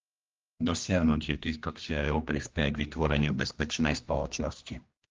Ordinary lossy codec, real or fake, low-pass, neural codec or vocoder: Opus, 24 kbps; fake; 7.2 kHz; codec, 16 kHz, 1 kbps, X-Codec, HuBERT features, trained on general audio